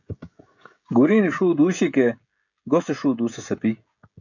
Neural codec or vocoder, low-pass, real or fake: codec, 16 kHz, 16 kbps, FreqCodec, smaller model; 7.2 kHz; fake